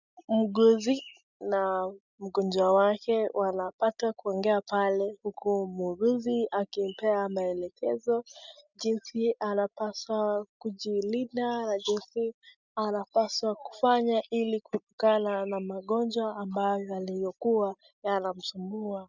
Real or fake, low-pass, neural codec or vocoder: real; 7.2 kHz; none